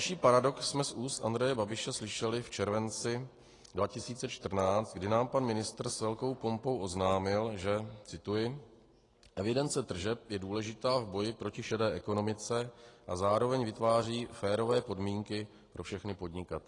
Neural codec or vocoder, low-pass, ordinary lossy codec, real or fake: none; 10.8 kHz; AAC, 32 kbps; real